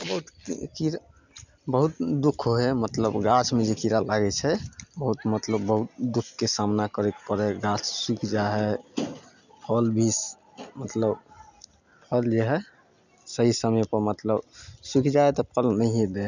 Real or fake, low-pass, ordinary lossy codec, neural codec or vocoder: real; 7.2 kHz; none; none